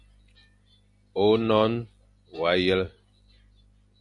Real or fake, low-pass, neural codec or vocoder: real; 10.8 kHz; none